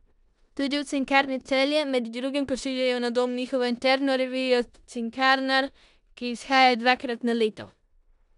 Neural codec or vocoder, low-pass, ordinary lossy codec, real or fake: codec, 16 kHz in and 24 kHz out, 0.9 kbps, LongCat-Audio-Codec, four codebook decoder; 10.8 kHz; none; fake